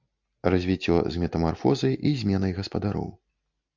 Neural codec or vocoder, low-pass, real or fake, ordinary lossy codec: none; 7.2 kHz; real; MP3, 64 kbps